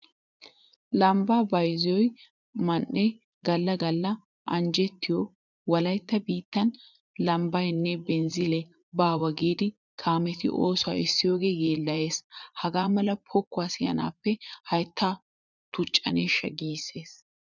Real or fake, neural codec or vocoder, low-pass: fake; vocoder, 44.1 kHz, 80 mel bands, Vocos; 7.2 kHz